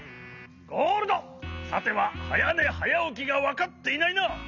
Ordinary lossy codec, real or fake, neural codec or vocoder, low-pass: none; real; none; 7.2 kHz